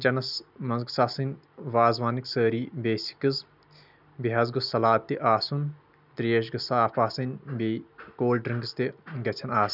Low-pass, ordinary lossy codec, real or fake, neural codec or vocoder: 5.4 kHz; none; real; none